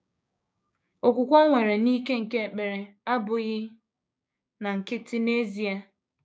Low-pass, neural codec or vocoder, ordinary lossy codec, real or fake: none; codec, 16 kHz, 6 kbps, DAC; none; fake